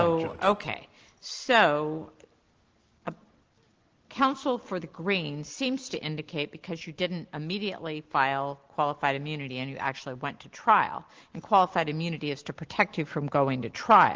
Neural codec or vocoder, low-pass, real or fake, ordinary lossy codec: none; 7.2 kHz; real; Opus, 16 kbps